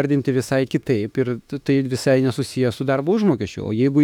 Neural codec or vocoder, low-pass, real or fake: autoencoder, 48 kHz, 32 numbers a frame, DAC-VAE, trained on Japanese speech; 19.8 kHz; fake